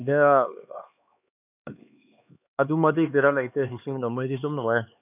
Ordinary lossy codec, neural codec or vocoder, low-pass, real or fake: none; codec, 16 kHz, 2 kbps, X-Codec, HuBERT features, trained on LibriSpeech; 3.6 kHz; fake